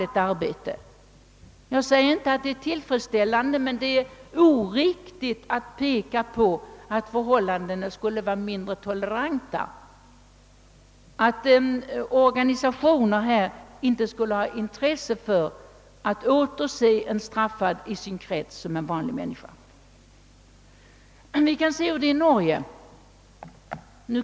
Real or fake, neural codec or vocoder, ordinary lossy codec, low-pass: real; none; none; none